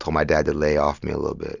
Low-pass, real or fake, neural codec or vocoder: 7.2 kHz; real; none